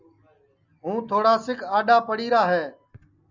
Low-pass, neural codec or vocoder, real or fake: 7.2 kHz; none; real